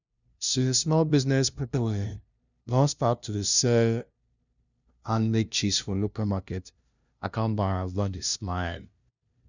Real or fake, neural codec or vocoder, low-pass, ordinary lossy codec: fake; codec, 16 kHz, 0.5 kbps, FunCodec, trained on LibriTTS, 25 frames a second; 7.2 kHz; none